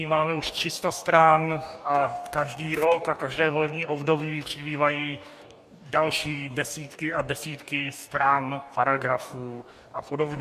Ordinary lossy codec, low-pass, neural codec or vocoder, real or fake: MP3, 96 kbps; 14.4 kHz; codec, 44.1 kHz, 2.6 kbps, DAC; fake